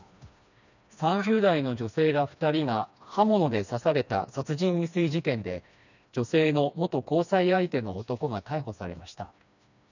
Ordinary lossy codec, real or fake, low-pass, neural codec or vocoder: none; fake; 7.2 kHz; codec, 16 kHz, 2 kbps, FreqCodec, smaller model